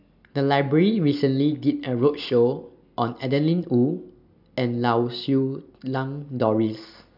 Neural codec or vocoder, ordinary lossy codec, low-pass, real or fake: none; none; 5.4 kHz; real